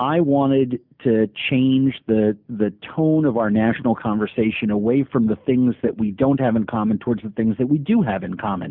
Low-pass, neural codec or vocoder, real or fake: 5.4 kHz; none; real